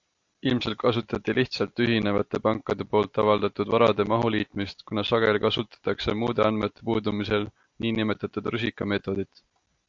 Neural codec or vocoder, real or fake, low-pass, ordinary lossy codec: none; real; 7.2 kHz; AAC, 64 kbps